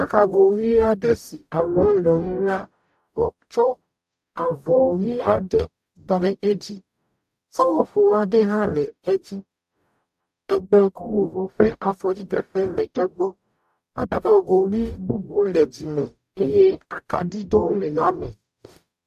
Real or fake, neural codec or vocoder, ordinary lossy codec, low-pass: fake; codec, 44.1 kHz, 0.9 kbps, DAC; AAC, 96 kbps; 14.4 kHz